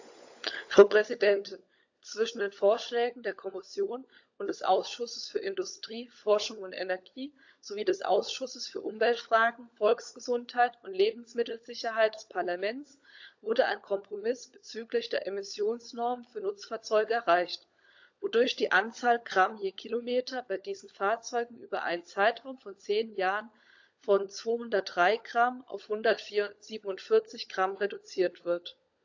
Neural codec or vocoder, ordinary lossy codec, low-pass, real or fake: codec, 16 kHz, 16 kbps, FunCodec, trained on LibriTTS, 50 frames a second; AAC, 48 kbps; 7.2 kHz; fake